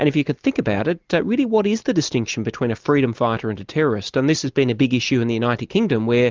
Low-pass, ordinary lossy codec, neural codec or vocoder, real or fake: 7.2 kHz; Opus, 24 kbps; none; real